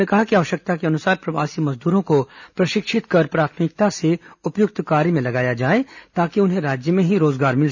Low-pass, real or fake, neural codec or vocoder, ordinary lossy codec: 7.2 kHz; real; none; none